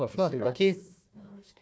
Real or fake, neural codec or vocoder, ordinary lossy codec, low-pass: fake; codec, 16 kHz, 1 kbps, FunCodec, trained on Chinese and English, 50 frames a second; none; none